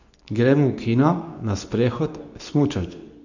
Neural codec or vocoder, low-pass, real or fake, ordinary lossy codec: codec, 24 kHz, 0.9 kbps, WavTokenizer, medium speech release version 2; 7.2 kHz; fake; none